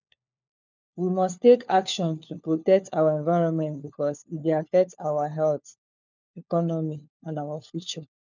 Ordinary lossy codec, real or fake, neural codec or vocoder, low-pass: none; fake; codec, 16 kHz, 4 kbps, FunCodec, trained on LibriTTS, 50 frames a second; 7.2 kHz